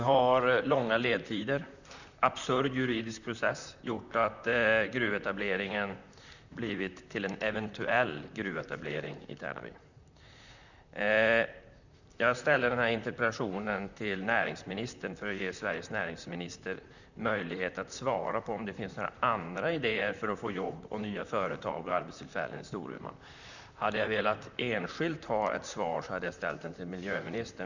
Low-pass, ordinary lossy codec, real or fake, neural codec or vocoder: 7.2 kHz; none; fake; vocoder, 44.1 kHz, 128 mel bands, Pupu-Vocoder